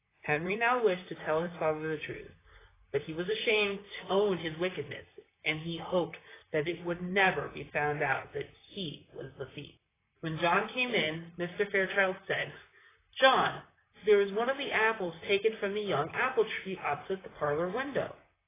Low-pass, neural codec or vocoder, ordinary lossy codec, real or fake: 3.6 kHz; vocoder, 44.1 kHz, 128 mel bands, Pupu-Vocoder; AAC, 16 kbps; fake